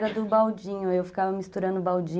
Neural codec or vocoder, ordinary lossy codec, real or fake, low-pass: none; none; real; none